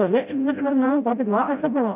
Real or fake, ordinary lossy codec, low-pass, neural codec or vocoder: fake; AAC, 32 kbps; 3.6 kHz; codec, 16 kHz, 0.5 kbps, FreqCodec, smaller model